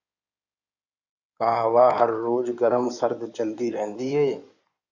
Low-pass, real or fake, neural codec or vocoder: 7.2 kHz; fake; codec, 16 kHz in and 24 kHz out, 2.2 kbps, FireRedTTS-2 codec